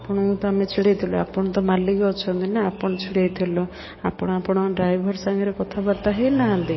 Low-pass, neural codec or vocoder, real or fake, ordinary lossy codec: 7.2 kHz; codec, 44.1 kHz, 7.8 kbps, DAC; fake; MP3, 24 kbps